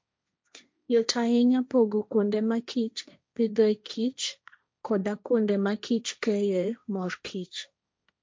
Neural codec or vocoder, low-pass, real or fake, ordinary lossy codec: codec, 16 kHz, 1.1 kbps, Voila-Tokenizer; none; fake; none